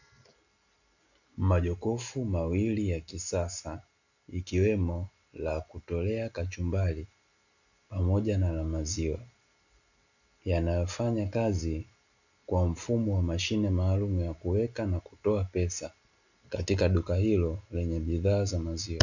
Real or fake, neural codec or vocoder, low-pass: real; none; 7.2 kHz